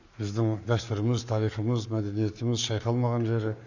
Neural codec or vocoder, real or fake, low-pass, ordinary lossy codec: codec, 44.1 kHz, 7.8 kbps, Pupu-Codec; fake; 7.2 kHz; none